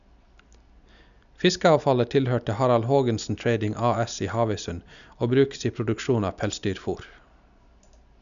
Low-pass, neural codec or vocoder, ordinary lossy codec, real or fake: 7.2 kHz; none; none; real